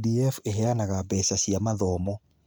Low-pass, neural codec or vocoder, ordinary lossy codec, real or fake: none; none; none; real